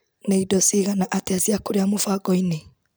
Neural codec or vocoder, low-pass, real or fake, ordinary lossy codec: none; none; real; none